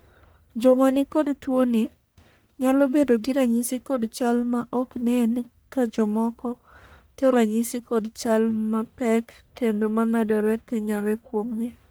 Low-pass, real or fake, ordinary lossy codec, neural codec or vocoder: none; fake; none; codec, 44.1 kHz, 1.7 kbps, Pupu-Codec